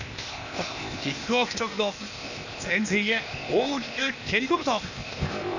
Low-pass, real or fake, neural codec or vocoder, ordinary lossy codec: 7.2 kHz; fake; codec, 16 kHz, 0.8 kbps, ZipCodec; none